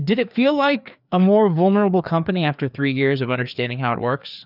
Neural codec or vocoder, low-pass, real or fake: codec, 16 kHz, 2 kbps, FreqCodec, larger model; 5.4 kHz; fake